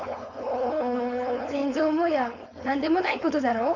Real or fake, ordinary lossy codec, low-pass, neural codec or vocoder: fake; none; 7.2 kHz; codec, 16 kHz, 4.8 kbps, FACodec